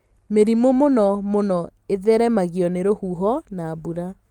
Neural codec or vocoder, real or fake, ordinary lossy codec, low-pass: none; real; Opus, 32 kbps; 19.8 kHz